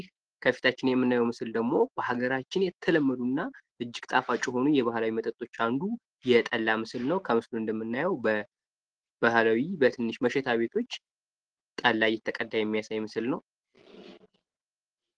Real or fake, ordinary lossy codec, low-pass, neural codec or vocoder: real; Opus, 16 kbps; 9.9 kHz; none